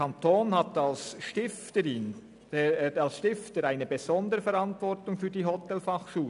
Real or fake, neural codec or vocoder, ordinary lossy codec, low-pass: real; none; none; 10.8 kHz